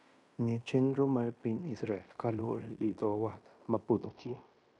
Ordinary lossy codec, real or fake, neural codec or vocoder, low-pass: none; fake; codec, 16 kHz in and 24 kHz out, 0.9 kbps, LongCat-Audio-Codec, fine tuned four codebook decoder; 10.8 kHz